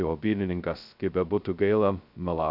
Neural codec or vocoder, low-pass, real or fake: codec, 16 kHz, 0.2 kbps, FocalCodec; 5.4 kHz; fake